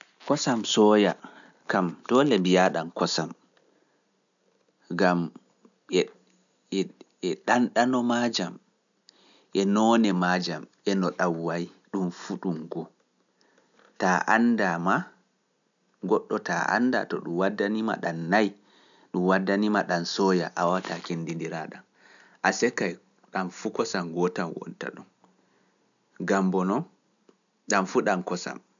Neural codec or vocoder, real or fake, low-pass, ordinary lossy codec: none; real; 7.2 kHz; none